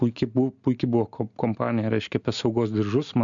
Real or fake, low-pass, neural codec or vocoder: real; 7.2 kHz; none